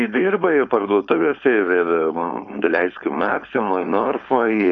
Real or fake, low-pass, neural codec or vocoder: fake; 7.2 kHz; codec, 16 kHz, 4.8 kbps, FACodec